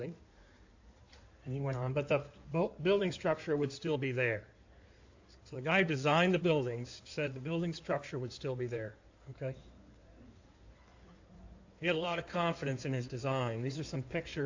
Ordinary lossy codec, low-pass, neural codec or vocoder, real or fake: AAC, 48 kbps; 7.2 kHz; codec, 16 kHz in and 24 kHz out, 2.2 kbps, FireRedTTS-2 codec; fake